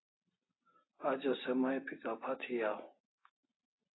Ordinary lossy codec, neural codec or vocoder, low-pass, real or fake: AAC, 16 kbps; none; 7.2 kHz; real